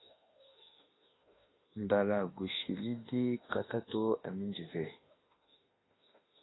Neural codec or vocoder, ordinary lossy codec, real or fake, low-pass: autoencoder, 48 kHz, 32 numbers a frame, DAC-VAE, trained on Japanese speech; AAC, 16 kbps; fake; 7.2 kHz